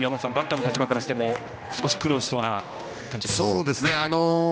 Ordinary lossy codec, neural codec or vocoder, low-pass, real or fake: none; codec, 16 kHz, 1 kbps, X-Codec, HuBERT features, trained on general audio; none; fake